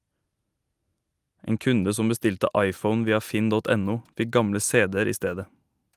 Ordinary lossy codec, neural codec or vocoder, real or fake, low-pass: Opus, 32 kbps; none; real; 14.4 kHz